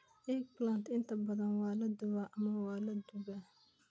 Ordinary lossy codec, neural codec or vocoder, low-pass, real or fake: none; none; none; real